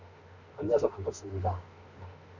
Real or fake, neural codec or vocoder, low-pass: fake; autoencoder, 48 kHz, 32 numbers a frame, DAC-VAE, trained on Japanese speech; 7.2 kHz